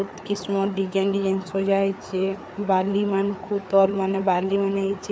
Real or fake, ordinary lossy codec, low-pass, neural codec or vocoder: fake; none; none; codec, 16 kHz, 4 kbps, FreqCodec, larger model